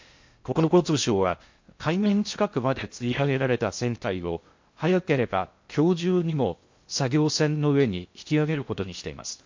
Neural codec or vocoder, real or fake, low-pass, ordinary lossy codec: codec, 16 kHz in and 24 kHz out, 0.6 kbps, FocalCodec, streaming, 2048 codes; fake; 7.2 kHz; MP3, 48 kbps